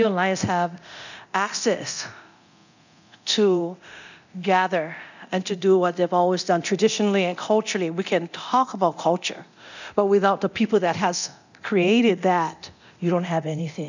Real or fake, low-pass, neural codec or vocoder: fake; 7.2 kHz; codec, 24 kHz, 0.9 kbps, DualCodec